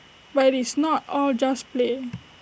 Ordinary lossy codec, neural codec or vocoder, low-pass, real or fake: none; none; none; real